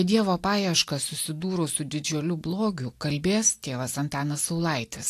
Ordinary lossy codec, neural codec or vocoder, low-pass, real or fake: AAC, 64 kbps; none; 14.4 kHz; real